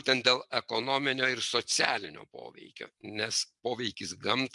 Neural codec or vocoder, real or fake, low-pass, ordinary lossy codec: none; real; 10.8 kHz; MP3, 64 kbps